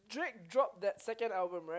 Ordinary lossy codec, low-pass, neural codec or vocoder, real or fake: none; none; none; real